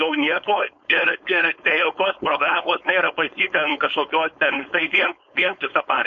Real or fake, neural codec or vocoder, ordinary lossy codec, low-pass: fake; codec, 16 kHz, 4.8 kbps, FACodec; MP3, 64 kbps; 7.2 kHz